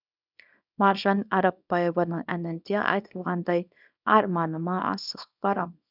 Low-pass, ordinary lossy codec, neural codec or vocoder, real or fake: 5.4 kHz; none; codec, 24 kHz, 0.9 kbps, WavTokenizer, small release; fake